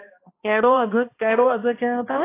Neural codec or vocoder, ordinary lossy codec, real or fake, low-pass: codec, 16 kHz, 1 kbps, X-Codec, HuBERT features, trained on balanced general audio; AAC, 24 kbps; fake; 3.6 kHz